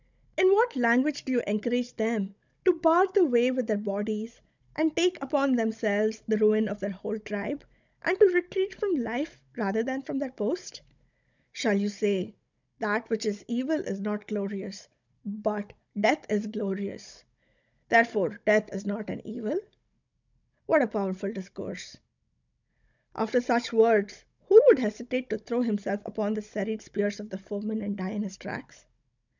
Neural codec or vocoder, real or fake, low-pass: codec, 16 kHz, 16 kbps, FunCodec, trained on Chinese and English, 50 frames a second; fake; 7.2 kHz